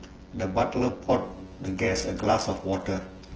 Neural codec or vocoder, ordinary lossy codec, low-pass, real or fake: vocoder, 24 kHz, 100 mel bands, Vocos; Opus, 16 kbps; 7.2 kHz; fake